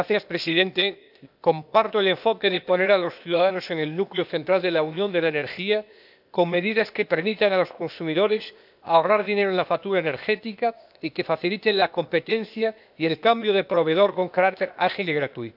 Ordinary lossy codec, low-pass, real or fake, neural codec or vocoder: none; 5.4 kHz; fake; codec, 16 kHz, 0.8 kbps, ZipCodec